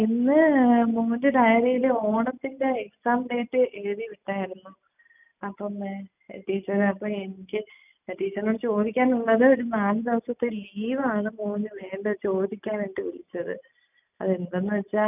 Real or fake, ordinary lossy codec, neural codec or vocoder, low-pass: real; none; none; 3.6 kHz